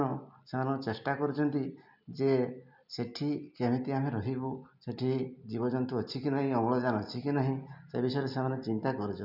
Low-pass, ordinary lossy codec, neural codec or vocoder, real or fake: 5.4 kHz; none; none; real